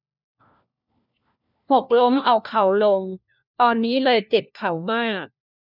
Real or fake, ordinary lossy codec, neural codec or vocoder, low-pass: fake; none; codec, 16 kHz, 1 kbps, FunCodec, trained on LibriTTS, 50 frames a second; 5.4 kHz